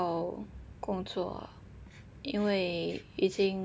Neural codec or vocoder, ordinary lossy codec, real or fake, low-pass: none; none; real; none